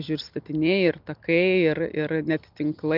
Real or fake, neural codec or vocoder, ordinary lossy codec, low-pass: real; none; Opus, 32 kbps; 5.4 kHz